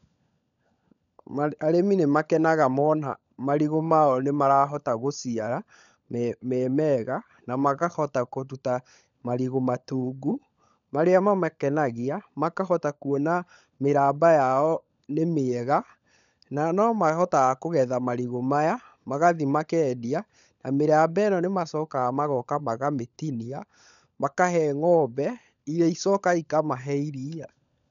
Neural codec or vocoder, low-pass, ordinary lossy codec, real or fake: codec, 16 kHz, 16 kbps, FunCodec, trained on LibriTTS, 50 frames a second; 7.2 kHz; none; fake